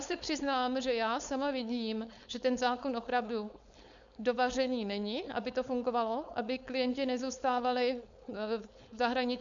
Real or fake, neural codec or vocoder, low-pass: fake; codec, 16 kHz, 4.8 kbps, FACodec; 7.2 kHz